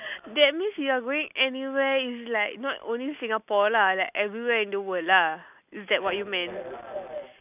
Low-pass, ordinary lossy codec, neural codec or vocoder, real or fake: 3.6 kHz; none; none; real